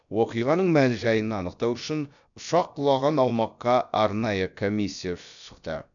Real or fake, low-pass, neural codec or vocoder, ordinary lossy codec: fake; 7.2 kHz; codec, 16 kHz, about 1 kbps, DyCAST, with the encoder's durations; none